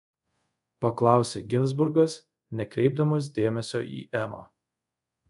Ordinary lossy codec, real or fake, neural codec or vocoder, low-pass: MP3, 96 kbps; fake; codec, 24 kHz, 0.5 kbps, DualCodec; 10.8 kHz